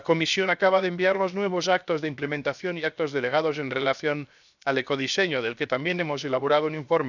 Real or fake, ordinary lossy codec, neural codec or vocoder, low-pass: fake; none; codec, 16 kHz, 0.7 kbps, FocalCodec; 7.2 kHz